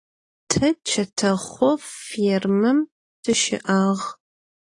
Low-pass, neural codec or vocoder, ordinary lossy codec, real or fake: 10.8 kHz; none; AAC, 32 kbps; real